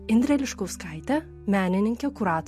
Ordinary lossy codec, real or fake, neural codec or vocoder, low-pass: MP3, 64 kbps; real; none; 14.4 kHz